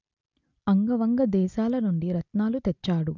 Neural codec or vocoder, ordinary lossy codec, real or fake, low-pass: none; none; real; 7.2 kHz